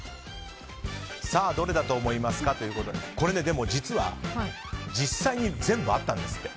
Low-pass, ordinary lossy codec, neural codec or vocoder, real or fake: none; none; none; real